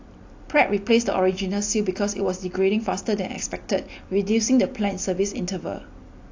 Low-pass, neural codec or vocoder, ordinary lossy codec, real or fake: 7.2 kHz; none; AAC, 48 kbps; real